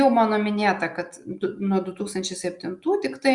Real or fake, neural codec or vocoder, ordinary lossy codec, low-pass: real; none; Opus, 64 kbps; 10.8 kHz